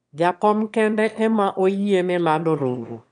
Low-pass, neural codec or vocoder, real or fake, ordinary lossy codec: 9.9 kHz; autoencoder, 22.05 kHz, a latent of 192 numbers a frame, VITS, trained on one speaker; fake; none